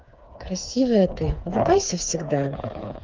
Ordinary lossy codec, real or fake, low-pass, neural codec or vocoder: Opus, 32 kbps; fake; 7.2 kHz; codec, 16 kHz, 4 kbps, FreqCodec, smaller model